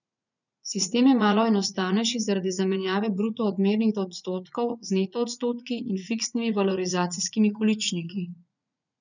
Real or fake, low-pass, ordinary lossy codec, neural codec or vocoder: fake; 7.2 kHz; none; vocoder, 44.1 kHz, 80 mel bands, Vocos